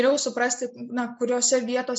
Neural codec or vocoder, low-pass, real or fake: none; 9.9 kHz; real